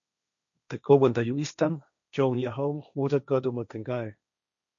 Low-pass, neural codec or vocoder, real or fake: 7.2 kHz; codec, 16 kHz, 1.1 kbps, Voila-Tokenizer; fake